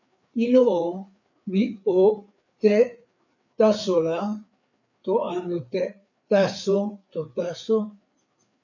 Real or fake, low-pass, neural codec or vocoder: fake; 7.2 kHz; codec, 16 kHz, 4 kbps, FreqCodec, larger model